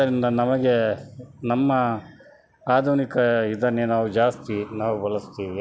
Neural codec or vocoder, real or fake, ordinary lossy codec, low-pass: none; real; none; none